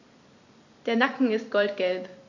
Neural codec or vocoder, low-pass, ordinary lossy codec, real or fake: vocoder, 44.1 kHz, 128 mel bands every 256 samples, BigVGAN v2; 7.2 kHz; none; fake